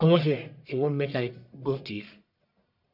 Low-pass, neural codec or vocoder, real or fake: 5.4 kHz; codec, 44.1 kHz, 1.7 kbps, Pupu-Codec; fake